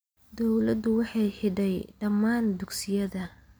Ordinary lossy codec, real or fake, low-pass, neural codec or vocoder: none; real; none; none